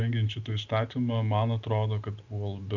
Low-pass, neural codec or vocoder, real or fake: 7.2 kHz; none; real